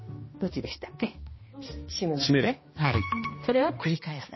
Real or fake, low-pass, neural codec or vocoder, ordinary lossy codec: fake; 7.2 kHz; codec, 16 kHz, 1 kbps, X-Codec, HuBERT features, trained on balanced general audio; MP3, 24 kbps